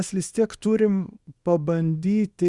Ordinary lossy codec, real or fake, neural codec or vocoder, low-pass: Opus, 64 kbps; fake; codec, 24 kHz, 0.9 kbps, WavTokenizer, small release; 10.8 kHz